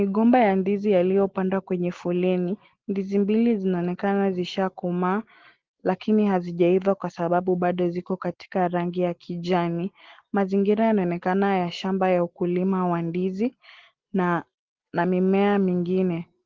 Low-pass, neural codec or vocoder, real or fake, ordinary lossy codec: 7.2 kHz; none; real; Opus, 16 kbps